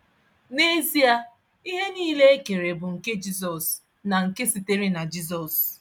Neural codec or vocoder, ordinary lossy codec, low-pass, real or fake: none; none; none; real